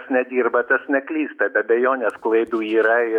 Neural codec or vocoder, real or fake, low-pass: autoencoder, 48 kHz, 128 numbers a frame, DAC-VAE, trained on Japanese speech; fake; 19.8 kHz